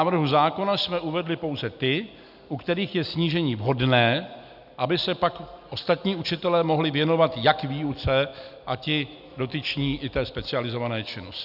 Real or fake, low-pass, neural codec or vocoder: real; 5.4 kHz; none